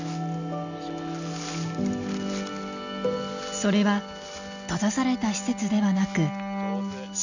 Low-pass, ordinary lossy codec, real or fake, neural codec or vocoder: 7.2 kHz; none; real; none